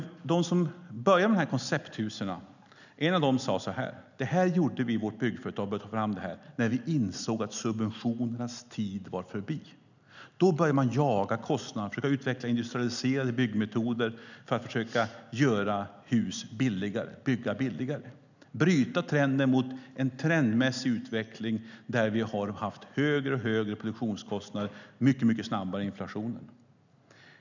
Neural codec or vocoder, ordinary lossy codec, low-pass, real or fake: none; none; 7.2 kHz; real